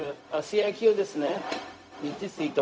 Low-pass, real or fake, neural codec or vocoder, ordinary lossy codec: none; fake; codec, 16 kHz, 0.4 kbps, LongCat-Audio-Codec; none